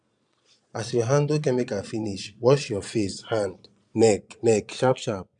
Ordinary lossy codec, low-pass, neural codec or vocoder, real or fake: none; 9.9 kHz; vocoder, 22.05 kHz, 80 mel bands, Vocos; fake